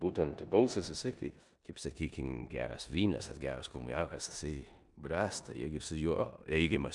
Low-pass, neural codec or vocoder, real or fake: 10.8 kHz; codec, 16 kHz in and 24 kHz out, 0.9 kbps, LongCat-Audio-Codec, four codebook decoder; fake